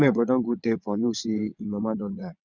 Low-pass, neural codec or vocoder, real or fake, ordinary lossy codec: 7.2 kHz; vocoder, 22.05 kHz, 80 mel bands, WaveNeXt; fake; none